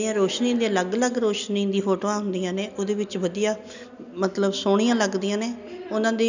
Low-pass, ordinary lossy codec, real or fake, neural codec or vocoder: 7.2 kHz; none; real; none